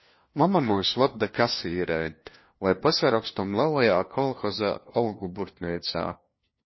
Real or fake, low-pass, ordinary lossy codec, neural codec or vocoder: fake; 7.2 kHz; MP3, 24 kbps; codec, 16 kHz, 1 kbps, FunCodec, trained on LibriTTS, 50 frames a second